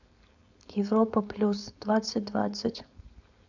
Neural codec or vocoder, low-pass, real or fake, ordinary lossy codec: codec, 16 kHz, 4.8 kbps, FACodec; 7.2 kHz; fake; none